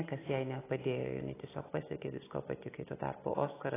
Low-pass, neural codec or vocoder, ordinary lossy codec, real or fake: 3.6 kHz; none; AAC, 16 kbps; real